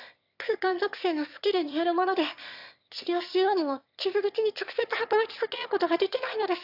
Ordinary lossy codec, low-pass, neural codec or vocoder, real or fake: AAC, 48 kbps; 5.4 kHz; autoencoder, 22.05 kHz, a latent of 192 numbers a frame, VITS, trained on one speaker; fake